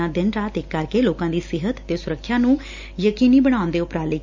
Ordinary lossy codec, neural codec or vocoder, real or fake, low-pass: MP3, 64 kbps; none; real; 7.2 kHz